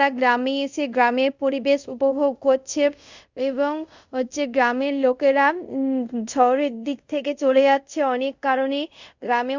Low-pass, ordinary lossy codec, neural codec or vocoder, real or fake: 7.2 kHz; Opus, 64 kbps; codec, 24 kHz, 0.5 kbps, DualCodec; fake